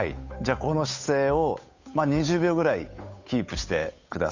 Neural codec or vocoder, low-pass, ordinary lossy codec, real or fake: codec, 16 kHz, 8 kbps, FunCodec, trained on Chinese and English, 25 frames a second; 7.2 kHz; Opus, 64 kbps; fake